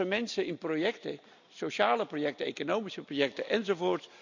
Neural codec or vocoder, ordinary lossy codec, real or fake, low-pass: none; none; real; 7.2 kHz